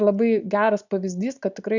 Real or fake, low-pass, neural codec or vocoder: real; 7.2 kHz; none